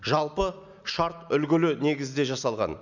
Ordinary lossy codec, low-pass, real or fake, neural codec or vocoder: none; 7.2 kHz; real; none